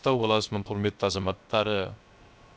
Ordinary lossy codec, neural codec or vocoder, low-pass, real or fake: none; codec, 16 kHz, 0.3 kbps, FocalCodec; none; fake